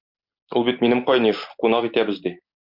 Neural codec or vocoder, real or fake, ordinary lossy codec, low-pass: none; real; MP3, 48 kbps; 5.4 kHz